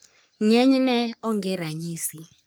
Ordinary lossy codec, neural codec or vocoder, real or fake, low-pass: none; codec, 44.1 kHz, 3.4 kbps, Pupu-Codec; fake; none